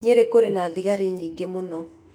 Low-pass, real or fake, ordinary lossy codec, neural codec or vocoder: 19.8 kHz; fake; none; autoencoder, 48 kHz, 32 numbers a frame, DAC-VAE, trained on Japanese speech